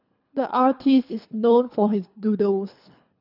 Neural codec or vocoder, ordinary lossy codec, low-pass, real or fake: codec, 24 kHz, 3 kbps, HILCodec; none; 5.4 kHz; fake